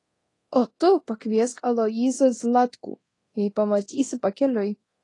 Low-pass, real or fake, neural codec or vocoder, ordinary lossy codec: 10.8 kHz; fake; codec, 24 kHz, 0.9 kbps, DualCodec; AAC, 32 kbps